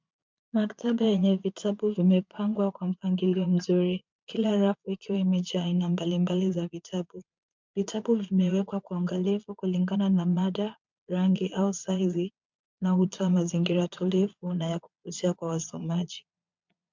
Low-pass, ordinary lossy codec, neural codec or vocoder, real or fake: 7.2 kHz; MP3, 64 kbps; vocoder, 22.05 kHz, 80 mel bands, Vocos; fake